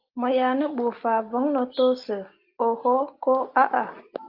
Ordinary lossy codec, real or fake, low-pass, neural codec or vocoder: Opus, 24 kbps; real; 5.4 kHz; none